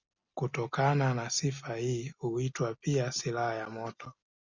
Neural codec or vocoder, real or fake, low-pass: none; real; 7.2 kHz